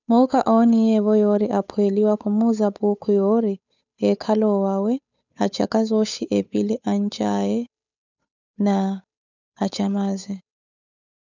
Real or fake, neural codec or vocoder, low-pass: fake; codec, 16 kHz, 8 kbps, FunCodec, trained on Chinese and English, 25 frames a second; 7.2 kHz